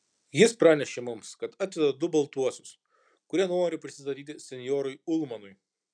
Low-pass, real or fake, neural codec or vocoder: 9.9 kHz; real; none